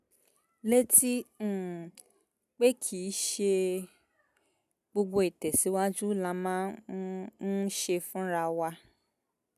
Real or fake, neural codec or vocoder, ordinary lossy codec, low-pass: real; none; none; 14.4 kHz